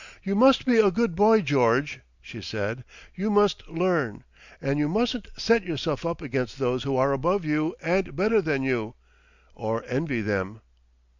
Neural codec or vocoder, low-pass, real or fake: none; 7.2 kHz; real